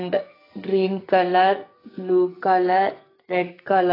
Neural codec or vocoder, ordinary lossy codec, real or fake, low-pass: codec, 44.1 kHz, 2.6 kbps, SNAC; AAC, 24 kbps; fake; 5.4 kHz